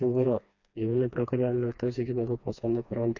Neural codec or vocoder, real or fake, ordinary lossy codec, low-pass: codec, 16 kHz, 2 kbps, FreqCodec, smaller model; fake; none; 7.2 kHz